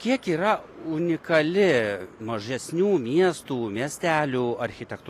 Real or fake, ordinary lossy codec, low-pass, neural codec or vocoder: real; AAC, 48 kbps; 14.4 kHz; none